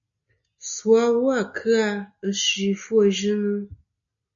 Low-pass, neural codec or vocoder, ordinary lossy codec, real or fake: 7.2 kHz; none; MP3, 64 kbps; real